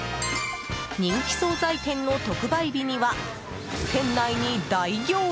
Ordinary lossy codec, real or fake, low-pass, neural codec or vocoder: none; real; none; none